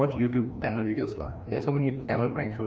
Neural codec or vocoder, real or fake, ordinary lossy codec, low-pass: codec, 16 kHz, 1 kbps, FreqCodec, larger model; fake; none; none